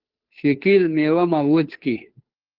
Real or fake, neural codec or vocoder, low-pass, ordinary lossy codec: fake; codec, 16 kHz, 2 kbps, FunCodec, trained on Chinese and English, 25 frames a second; 5.4 kHz; Opus, 16 kbps